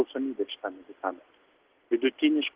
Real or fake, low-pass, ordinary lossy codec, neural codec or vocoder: real; 3.6 kHz; Opus, 16 kbps; none